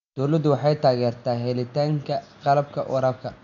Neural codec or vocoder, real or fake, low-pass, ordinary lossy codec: none; real; 7.2 kHz; none